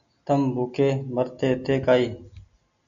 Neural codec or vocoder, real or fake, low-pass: none; real; 7.2 kHz